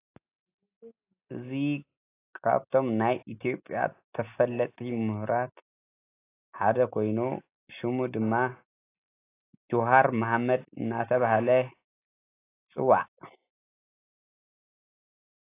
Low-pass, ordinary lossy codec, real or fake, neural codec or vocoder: 3.6 kHz; AAC, 24 kbps; real; none